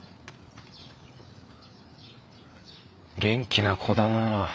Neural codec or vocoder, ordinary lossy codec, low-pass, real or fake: codec, 16 kHz, 4 kbps, FreqCodec, larger model; none; none; fake